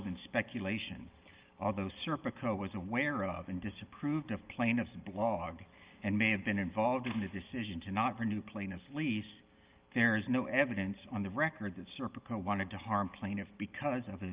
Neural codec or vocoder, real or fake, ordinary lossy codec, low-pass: none; real; Opus, 32 kbps; 3.6 kHz